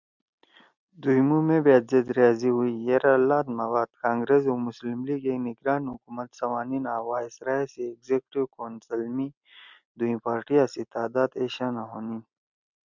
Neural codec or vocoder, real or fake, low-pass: none; real; 7.2 kHz